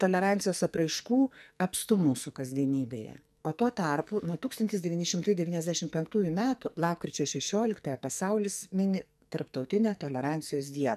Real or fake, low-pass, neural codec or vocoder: fake; 14.4 kHz; codec, 44.1 kHz, 2.6 kbps, SNAC